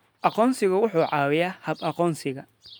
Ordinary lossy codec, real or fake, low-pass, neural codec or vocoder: none; real; none; none